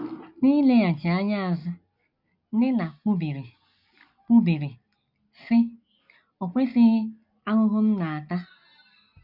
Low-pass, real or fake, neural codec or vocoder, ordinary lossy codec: 5.4 kHz; real; none; none